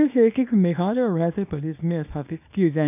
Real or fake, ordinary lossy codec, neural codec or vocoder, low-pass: fake; none; codec, 24 kHz, 0.9 kbps, WavTokenizer, small release; 3.6 kHz